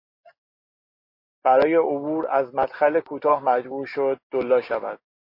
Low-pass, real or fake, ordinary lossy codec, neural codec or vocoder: 5.4 kHz; real; MP3, 32 kbps; none